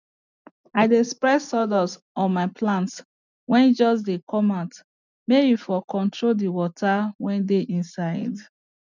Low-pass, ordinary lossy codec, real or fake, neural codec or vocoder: 7.2 kHz; none; real; none